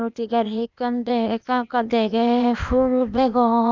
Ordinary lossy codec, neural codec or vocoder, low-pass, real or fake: none; codec, 16 kHz, 0.8 kbps, ZipCodec; 7.2 kHz; fake